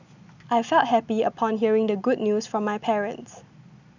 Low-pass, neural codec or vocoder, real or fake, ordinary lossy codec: 7.2 kHz; none; real; none